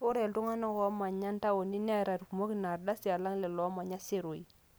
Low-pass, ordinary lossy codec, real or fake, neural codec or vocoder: none; none; real; none